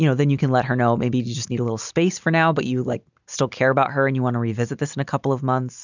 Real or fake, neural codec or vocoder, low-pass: real; none; 7.2 kHz